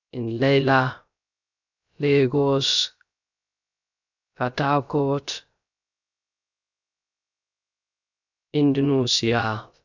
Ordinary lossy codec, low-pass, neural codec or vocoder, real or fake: none; 7.2 kHz; codec, 16 kHz, 0.3 kbps, FocalCodec; fake